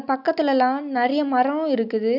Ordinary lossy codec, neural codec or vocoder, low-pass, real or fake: none; none; 5.4 kHz; real